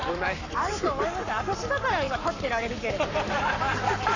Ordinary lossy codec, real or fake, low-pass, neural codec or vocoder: none; fake; 7.2 kHz; codec, 44.1 kHz, 7.8 kbps, Pupu-Codec